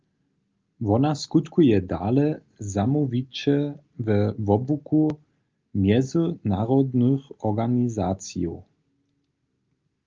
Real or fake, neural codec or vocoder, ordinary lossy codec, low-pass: real; none; Opus, 24 kbps; 7.2 kHz